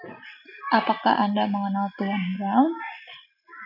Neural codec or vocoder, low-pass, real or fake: none; 5.4 kHz; real